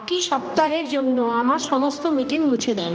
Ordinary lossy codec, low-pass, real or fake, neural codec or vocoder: none; none; fake; codec, 16 kHz, 1 kbps, X-Codec, HuBERT features, trained on general audio